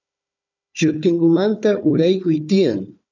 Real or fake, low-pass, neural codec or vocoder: fake; 7.2 kHz; codec, 16 kHz, 4 kbps, FunCodec, trained on Chinese and English, 50 frames a second